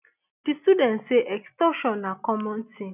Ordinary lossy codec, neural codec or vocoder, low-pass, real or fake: none; none; 3.6 kHz; real